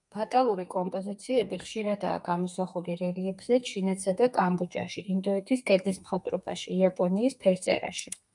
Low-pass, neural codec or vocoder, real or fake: 10.8 kHz; codec, 32 kHz, 1.9 kbps, SNAC; fake